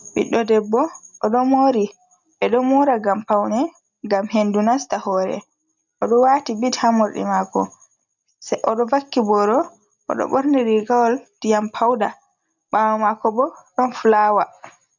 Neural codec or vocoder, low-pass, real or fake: none; 7.2 kHz; real